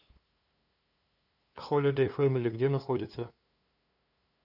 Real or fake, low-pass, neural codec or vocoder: fake; 5.4 kHz; codec, 16 kHz, 2 kbps, FunCodec, trained on LibriTTS, 25 frames a second